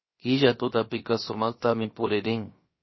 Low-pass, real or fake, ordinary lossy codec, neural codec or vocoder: 7.2 kHz; fake; MP3, 24 kbps; codec, 16 kHz, about 1 kbps, DyCAST, with the encoder's durations